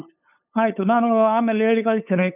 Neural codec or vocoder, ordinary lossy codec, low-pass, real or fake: codec, 16 kHz, 8 kbps, FunCodec, trained on LibriTTS, 25 frames a second; none; 3.6 kHz; fake